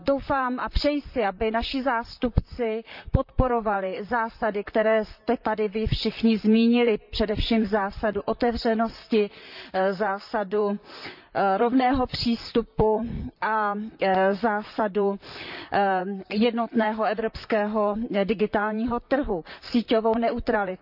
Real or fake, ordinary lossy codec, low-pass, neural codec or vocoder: fake; none; 5.4 kHz; vocoder, 44.1 kHz, 128 mel bands, Pupu-Vocoder